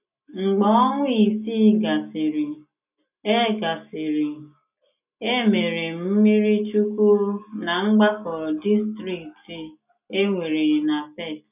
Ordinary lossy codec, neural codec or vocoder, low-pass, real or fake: none; none; 3.6 kHz; real